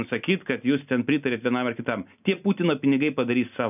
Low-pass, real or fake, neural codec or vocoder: 3.6 kHz; real; none